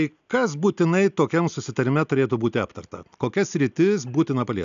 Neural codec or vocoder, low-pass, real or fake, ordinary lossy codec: none; 7.2 kHz; real; AAC, 96 kbps